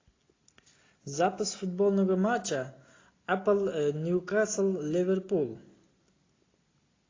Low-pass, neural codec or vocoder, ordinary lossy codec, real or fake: 7.2 kHz; none; AAC, 32 kbps; real